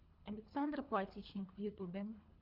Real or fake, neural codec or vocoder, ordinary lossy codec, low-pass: fake; codec, 24 kHz, 3 kbps, HILCodec; Opus, 64 kbps; 5.4 kHz